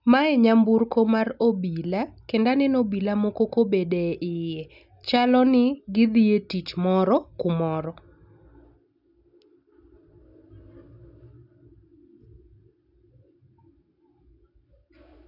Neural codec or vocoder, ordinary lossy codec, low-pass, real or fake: none; none; 5.4 kHz; real